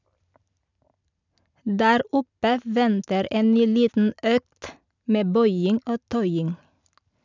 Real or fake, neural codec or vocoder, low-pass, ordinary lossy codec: real; none; 7.2 kHz; none